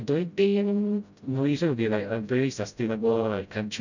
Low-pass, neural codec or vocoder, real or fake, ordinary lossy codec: 7.2 kHz; codec, 16 kHz, 0.5 kbps, FreqCodec, smaller model; fake; none